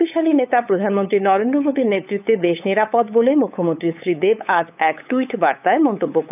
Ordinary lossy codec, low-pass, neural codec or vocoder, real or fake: none; 3.6 kHz; codec, 16 kHz, 8 kbps, FunCodec, trained on LibriTTS, 25 frames a second; fake